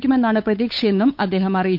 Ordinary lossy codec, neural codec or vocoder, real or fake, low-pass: none; codec, 16 kHz, 8 kbps, FunCodec, trained on Chinese and English, 25 frames a second; fake; 5.4 kHz